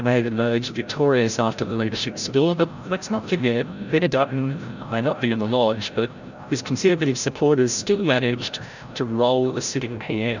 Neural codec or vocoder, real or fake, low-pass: codec, 16 kHz, 0.5 kbps, FreqCodec, larger model; fake; 7.2 kHz